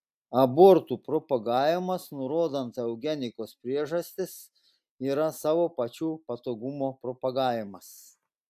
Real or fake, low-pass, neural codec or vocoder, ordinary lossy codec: real; 14.4 kHz; none; AAC, 96 kbps